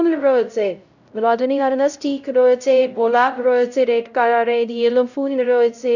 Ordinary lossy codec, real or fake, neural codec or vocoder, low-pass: none; fake; codec, 16 kHz, 0.5 kbps, X-Codec, HuBERT features, trained on LibriSpeech; 7.2 kHz